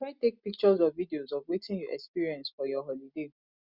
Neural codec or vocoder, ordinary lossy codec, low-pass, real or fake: none; Opus, 64 kbps; 5.4 kHz; real